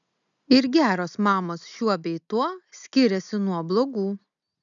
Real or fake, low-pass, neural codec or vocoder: real; 7.2 kHz; none